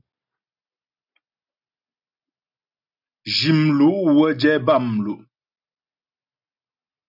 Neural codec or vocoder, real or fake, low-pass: none; real; 5.4 kHz